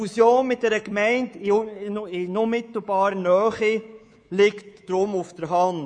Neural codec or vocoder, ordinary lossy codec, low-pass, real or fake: codec, 24 kHz, 3.1 kbps, DualCodec; none; 9.9 kHz; fake